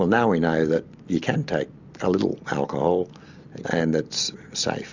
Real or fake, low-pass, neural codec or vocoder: real; 7.2 kHz; none